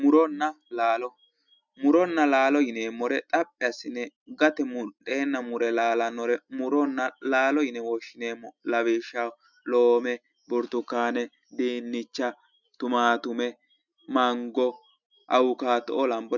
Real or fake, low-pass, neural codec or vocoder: real; 7.2 kHz; none